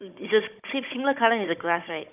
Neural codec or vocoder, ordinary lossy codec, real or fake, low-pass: codec, 16 kHz, 16 kbps, FunCodec, trained on Chinese and English, 50 frames a second; none; fake; 3.6 kHz